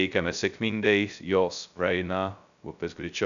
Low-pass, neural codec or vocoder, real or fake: 7.2 kHz; codec, 16 kHz, 0.2 kbps, FocalCodec; fake